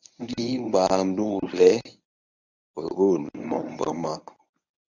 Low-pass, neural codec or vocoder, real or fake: 7.2 kHz; codec, 24 kHz, 0.9 kbps, WavTokenizer, medium speech release version 1; fake